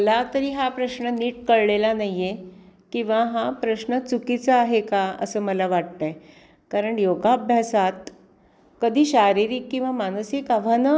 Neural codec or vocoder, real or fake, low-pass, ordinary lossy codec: none; real; none; none